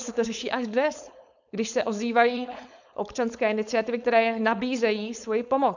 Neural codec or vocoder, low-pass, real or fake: codec, 16 kHz, 4.8 kbps, FACodec; 7.2 kHz; fake